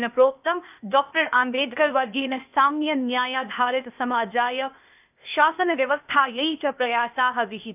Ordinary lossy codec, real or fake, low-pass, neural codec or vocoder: none; fake; 3.6 kHz; codec, 16 kHz, 0.8 kbps, ZipCodec